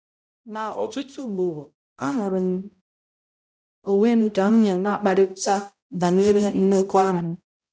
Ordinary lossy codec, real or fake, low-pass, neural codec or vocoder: none; fake; none; codec, 16 kHz, 0.5 kbps, X-Codec, HuBERT features, trained on balanced general audio